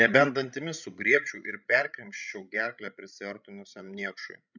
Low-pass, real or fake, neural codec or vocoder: 7.2 kHz; fake; codec, 16 kHz, 16 kbps, FreqCodec, larger model